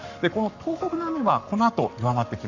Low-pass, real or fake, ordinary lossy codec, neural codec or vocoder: 7.2 kHz; fake; none; codec, 44.1 kHz, 7.8 kbps, Pupu-Codec